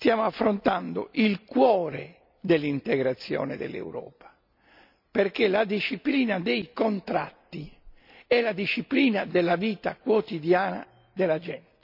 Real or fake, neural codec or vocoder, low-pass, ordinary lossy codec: real; none; 5.4 kHz; none